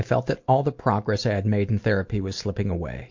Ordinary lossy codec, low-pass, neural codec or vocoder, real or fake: MP3, 48 kbps; 7.2 kHz; none; real